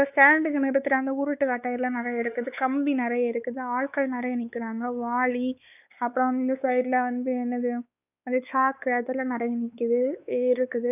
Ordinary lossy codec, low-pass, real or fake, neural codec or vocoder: none; 3.6 kHz; fake; codec, 16 kHz, 4 kbps, X-Codec, WavLM features, trained on Multilingual LibriSpeech